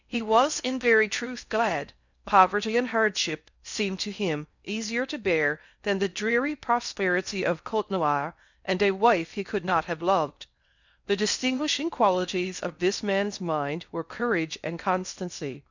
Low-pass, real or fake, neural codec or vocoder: 7.2 kHz; fake; codec, 16 kHz in and 24 kHz out, 0.6 kbps, FocalCodec, streaming, 4096 codes